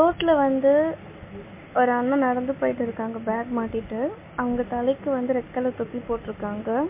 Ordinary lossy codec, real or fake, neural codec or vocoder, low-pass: MP3, 24 kbps; real; none; 3.6 kHz